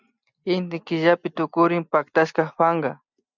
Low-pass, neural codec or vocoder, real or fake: 7.2 kHz; none; real